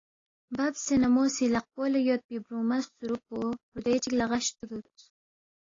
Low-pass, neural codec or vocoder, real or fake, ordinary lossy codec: 7.2 kHz; none; real; AAC, 32 kbps